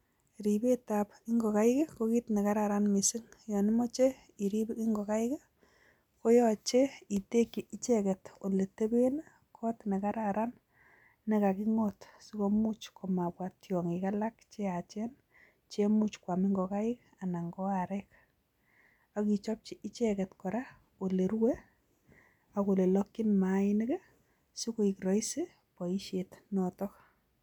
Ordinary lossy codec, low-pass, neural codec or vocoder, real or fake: none; 19.8 kHz; none; real